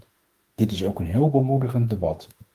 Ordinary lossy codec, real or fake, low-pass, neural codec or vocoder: Opus, 32 kbps; fake; 14.4 kHz; autoencoder, 48 kHz, 32 numbers a frame, DAC-VAE, trained on Japanese speech